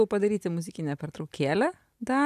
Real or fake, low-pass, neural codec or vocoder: real; 14.4 kHz; none